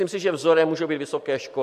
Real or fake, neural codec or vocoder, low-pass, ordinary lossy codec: real; none; 14.4 kHz; MP3, 64 kbps